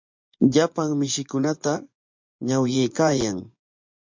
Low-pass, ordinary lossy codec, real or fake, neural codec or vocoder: 7.2 kHz; MP3, 48 kbps; fake; vocoder, 24 kHz, 100 mel bands, Vocos